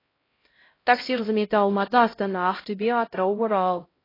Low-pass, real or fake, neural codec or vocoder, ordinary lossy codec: 5.4 kHz; fake; codec, 16 kHz, 0.5 kbps, X-Codec, HuBERT features, trained on LibriSpeech; AAC, 24 kbps